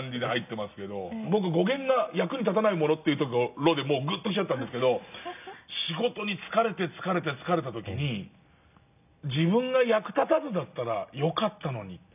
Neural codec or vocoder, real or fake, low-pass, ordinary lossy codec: none; real; 3.6 kHz; none